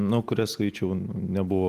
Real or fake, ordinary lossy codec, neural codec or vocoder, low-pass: real; Opus, 32 kbps; none; 14.4 kHz